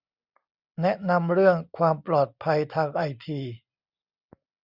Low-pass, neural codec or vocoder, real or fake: 5.4 kHz; none; real